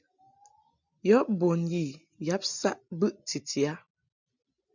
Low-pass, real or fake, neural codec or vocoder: 7.2 kHz; real; none